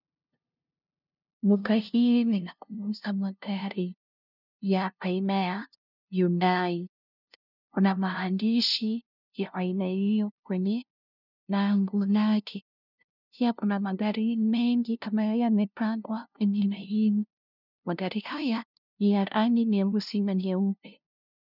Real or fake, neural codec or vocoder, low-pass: fake; codec, 16 kHz, 0.5 kbps, FunCodec, trained on LibriTTS, 25 frames a second; 5.4 kHz